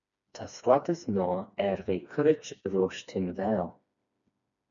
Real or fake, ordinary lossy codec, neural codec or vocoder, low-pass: fake; MP3, 96 kbps; codec, 16 kHz, 2 kbps, FreqCodec, smaller model; 7.2 kHz